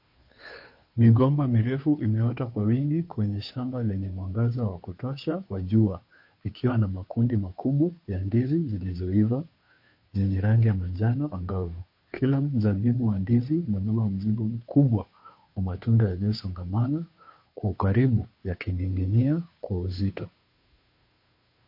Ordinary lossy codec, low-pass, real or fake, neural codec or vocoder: MP3, 32 kbps; 5.4 kHz; fake; codec, 16 kHz, 2 kbps, FunCodec, trained on Chinese and English, 25 frames a second